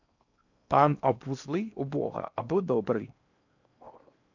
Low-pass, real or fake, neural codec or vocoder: 7.2 kHz; fake; codec, 16 kHz in and 24 kHz out, 0.8 kbps, FocalCodec, streaming, 65536 codes